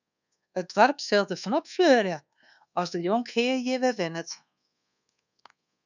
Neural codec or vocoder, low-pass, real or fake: codec, 24 kHz, 1.2 kbps, DualCodec; 7.2 kHz; fake